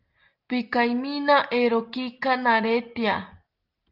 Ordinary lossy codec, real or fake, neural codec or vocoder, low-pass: Opus, 24 kbps; real; none; 5.4 kHz